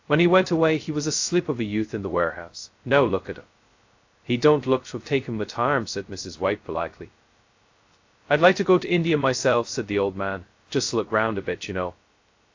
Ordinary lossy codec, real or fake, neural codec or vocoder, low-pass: AAC, 48 kbps; fake; codec, 16 kHz, 0.2 kbps, FocalCodec; 7.2 kHz